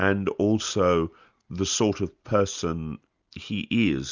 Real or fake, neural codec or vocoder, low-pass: real; none; 7.2 kHz